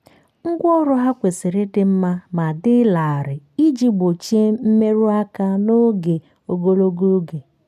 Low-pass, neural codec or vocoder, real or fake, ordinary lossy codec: 14.4 kHz; none; real; none